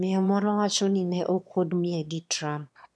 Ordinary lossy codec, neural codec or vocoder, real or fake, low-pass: none; autoencoder, 22.05 kHz, a latent of 192 numbers a frame, VITS, trained on one speaker; fake; none